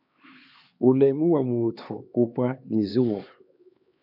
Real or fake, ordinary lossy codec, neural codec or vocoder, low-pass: fake; AAC, 48 kbps; codec, 16 kHz, 4 kbps, X-Codec, HuBERT features, trained on LibriSpeech; 5.4 kHz